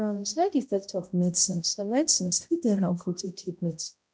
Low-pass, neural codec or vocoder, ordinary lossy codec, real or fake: none; codec, 16 kHz, 0.5 kbps, X-Codec, HuBERT features, trained on balanced general audio; none; fake